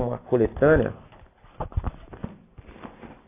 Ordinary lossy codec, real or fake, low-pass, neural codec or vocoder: AAC, 24 kbps; real; 3.6 kHz; none